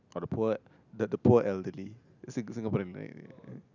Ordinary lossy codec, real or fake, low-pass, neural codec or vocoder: none; real; 7.2 kHz; none